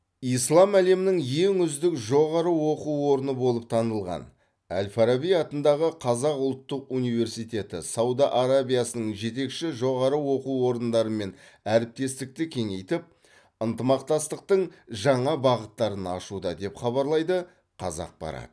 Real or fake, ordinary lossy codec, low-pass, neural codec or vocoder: real; none; none; none